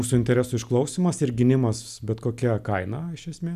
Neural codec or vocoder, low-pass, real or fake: none; 14.4 kHz; real